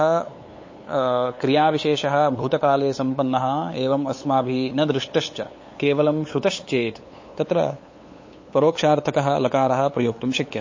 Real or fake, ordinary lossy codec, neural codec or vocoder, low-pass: fake; MP3, 32 kbps; codec, 16 kHz, 8 kbps, FunCodec, trained on LibriTTS, 25 frames a second; 7.2 kHz